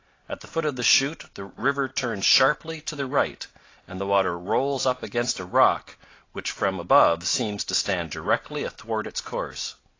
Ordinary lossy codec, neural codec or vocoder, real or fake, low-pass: AAC, 32 kbps; none; real; 7.2 kHz